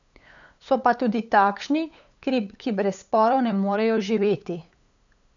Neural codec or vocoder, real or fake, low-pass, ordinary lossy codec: codec, 16 kHz, 8 kbps, FunCodec, trained on LibriTTS, 25 frames a second; fake; 7.2 kHz; none